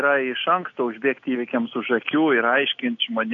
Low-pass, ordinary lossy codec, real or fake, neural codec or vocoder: 7.2 kHz; AAC, 48 kbps; real; none